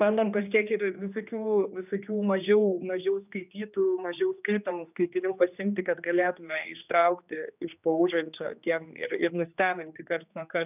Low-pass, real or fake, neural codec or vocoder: 3.6 kHz; fake; codec, 16 kHz, 2 kbps, X-Codec, HuBERT features, trained on general audio